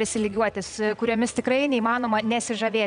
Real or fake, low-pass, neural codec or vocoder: fake; 9.9 kHz; vocoder, 22.05 kHz, 80 mel bands, Vocos